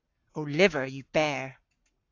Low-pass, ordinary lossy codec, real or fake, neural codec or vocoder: 7.2 kHz; AAC, 48 kbps; fake; codec, 44.1 kHz, 7.8 kbps, Pupu-Codec